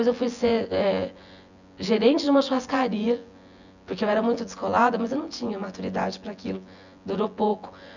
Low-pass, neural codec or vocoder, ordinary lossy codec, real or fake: 7.2 kHz; vocoder, 24 kHz, 100 mel bands, Vocos; none; fake